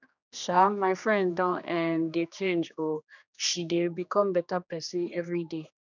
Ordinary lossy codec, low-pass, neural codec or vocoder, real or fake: none; 7.2 kHz; codec, 16 kHz, 2 kbps, X-Codec, HuBERT features, trained on general audio; fake